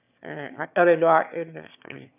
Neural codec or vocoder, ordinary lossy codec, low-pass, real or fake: autoencoder, 22.05 kHz, a latent of 192 numbers a frame, VITS, trained on one speaker; none; 3.6 kHz; fake